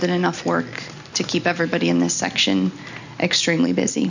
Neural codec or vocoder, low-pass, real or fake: none; 7.2 kHz; real